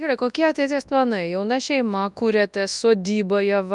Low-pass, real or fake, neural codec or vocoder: 10.8 kHz; fake; codec, 24 kHz, 0.9 kbps, WavTokenizer, large speech release